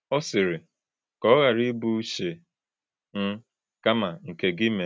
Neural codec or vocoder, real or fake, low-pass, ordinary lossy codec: none; real; none; none